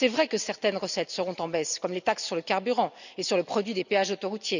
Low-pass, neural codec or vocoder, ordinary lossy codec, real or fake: 7.2 kHz; none; none; real